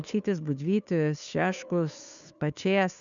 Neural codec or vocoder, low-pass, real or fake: codec, 16 kHz, 2 kbps, FunCodec, trained on Chinese and English, 25 frames a second; 7.2 kHz; fake